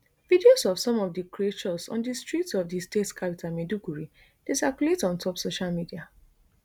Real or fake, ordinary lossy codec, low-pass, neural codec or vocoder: real; none; none; none